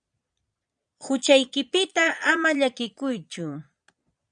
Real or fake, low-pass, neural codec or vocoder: fake; 9.9 kHz; vocoder, 22.05 kHz, 80 mel bands, Vocos